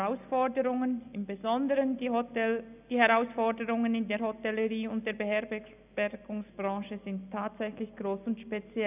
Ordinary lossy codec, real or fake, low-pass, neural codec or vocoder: none; real; 3.6 kHz; none